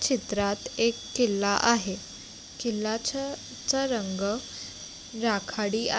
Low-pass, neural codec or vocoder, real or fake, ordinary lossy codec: none; none; real; none